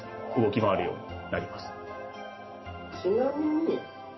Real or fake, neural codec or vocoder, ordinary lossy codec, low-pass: real; none; MP3, 24 kbps; 7.2 kHz